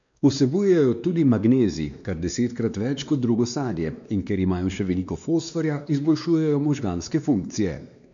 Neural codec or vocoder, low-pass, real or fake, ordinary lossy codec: codec, 16 kHz, 2 kbps, X-Codec, WavLM features, trained on Multilingual LibriSpeech; 7.2 kHz; fake; none